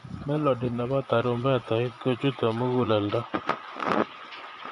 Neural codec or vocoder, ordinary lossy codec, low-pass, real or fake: vocoder, 24 kHz, 100 mel bands, Vocos; none; 10.8 kHz; fake